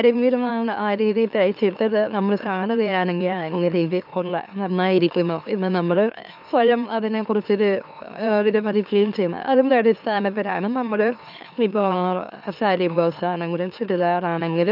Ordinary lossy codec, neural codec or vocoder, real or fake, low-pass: none; autoencoder, 44.1 kHz, a latent of 192 numbers a frame, MeloTTS; fake; 5.4 kHz